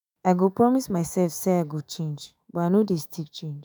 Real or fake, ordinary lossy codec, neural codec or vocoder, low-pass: fake; none; autoencoder, 48 kHz, 128 numbers a frame, DAC-VAE, trained on Japanese speech; none